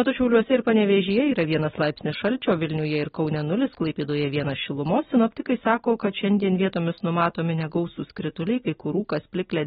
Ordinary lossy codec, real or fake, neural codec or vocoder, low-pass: AAC, 16 kbps; real; none; 19.8 kHz